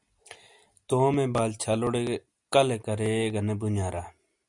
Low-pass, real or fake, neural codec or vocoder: 10.8 kHz; real; none